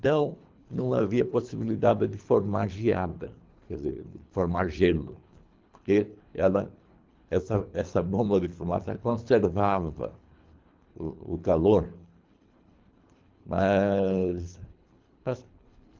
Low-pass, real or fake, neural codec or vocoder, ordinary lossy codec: 7.2 kHz; fake; codec, 24 kHz, 3 kbps, HILCodec; Opus, 32 kbps